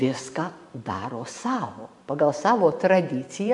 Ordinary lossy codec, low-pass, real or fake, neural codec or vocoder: AAC, 64 kbps; 10.8 kHz; fake; vocoder, 44.1 kHz, 128 mel bands every 512 samples, BigVGAN v2